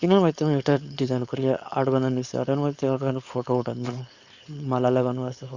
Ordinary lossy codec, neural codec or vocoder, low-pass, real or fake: Opus, 64 kbps; codec, 16 kHz, 4.8 kbps, FACodec; 7.2 kHz; fake